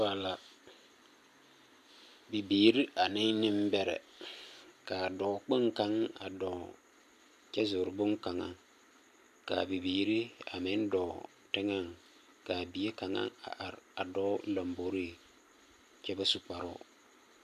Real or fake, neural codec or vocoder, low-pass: real; none; 14.4 kHz